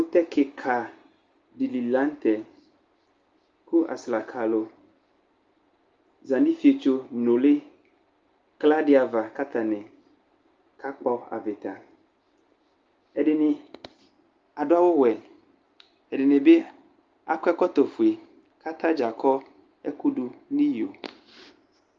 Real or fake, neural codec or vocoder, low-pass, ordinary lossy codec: real; none; 7.2 kHz; Opus, 32 kbps